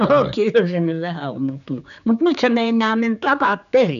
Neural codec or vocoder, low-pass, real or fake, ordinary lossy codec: codec, 16 kHz, 4 kbps, X-Codec, HuBERT features, trained on general audio; 7.2 kHz; fake; none